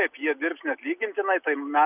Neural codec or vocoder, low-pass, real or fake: none; 3.6 kHz; real